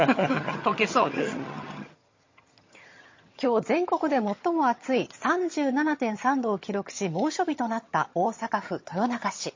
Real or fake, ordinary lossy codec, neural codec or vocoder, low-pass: fake; MP3, 32 kbps; vocoder, 22.05 kHz, 80 mel bands, HiFi-GAN; 7.2 kHz